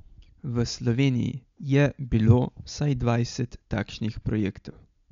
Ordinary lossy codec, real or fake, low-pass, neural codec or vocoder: MP3, 64 kbps; real; 7.2 kHz; none